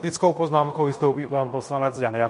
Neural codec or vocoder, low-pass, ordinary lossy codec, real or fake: codec, 16 kHz in and 24 kHz out, 0.9 kbps, LongCat-Audio-Codec, fine tuned four codebook decoder; 10.8 kHz; MP3, 48 kbps; fake